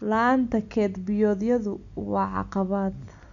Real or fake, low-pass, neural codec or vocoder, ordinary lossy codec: real; 7.2 kHz; none; none